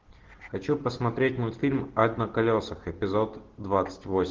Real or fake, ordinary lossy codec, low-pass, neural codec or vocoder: real; Opus, 16 kbps; 7.2 kHz; none